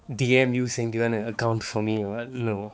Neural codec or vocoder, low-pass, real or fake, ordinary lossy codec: codec, 16 kHz, 4 kbps, X-Codec, HuBERT features, trained on balanced general audio; none; fake; none